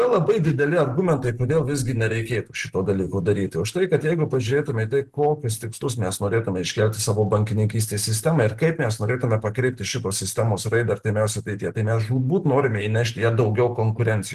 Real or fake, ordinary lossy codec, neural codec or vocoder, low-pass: real; Opus, 16 kbps; none; 14.4 kHz